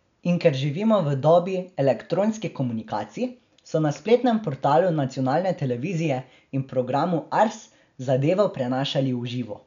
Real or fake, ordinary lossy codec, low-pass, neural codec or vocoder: real; none; 7.2 kHz; none